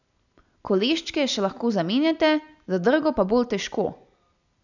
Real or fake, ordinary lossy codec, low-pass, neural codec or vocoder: real; none; 7.2 kHz; none